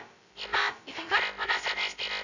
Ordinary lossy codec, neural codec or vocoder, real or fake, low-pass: none; codec, 16 kHz, 0.2 kbps, FocalCodec; fake; 7.2 kHz